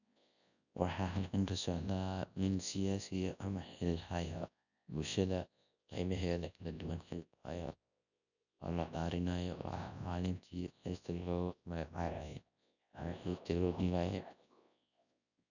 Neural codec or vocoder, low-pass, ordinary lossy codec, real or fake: codec, 24 kHz, 0.9 kbps, WavTokenizer, large speech release; 7.2 kHz; none; fake